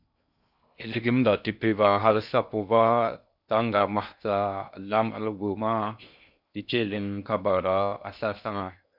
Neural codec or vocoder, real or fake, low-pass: codec, 16 kHz in and 24 kHz out, 0.6 kbps, FocalCodec, streaming, 2048 codes; fake; 5.4 kHz